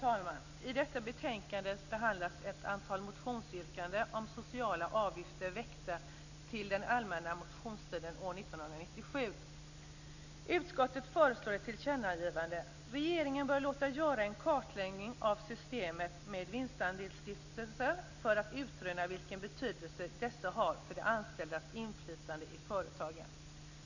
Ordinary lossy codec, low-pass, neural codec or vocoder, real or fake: none; 7.2 kHz; none; real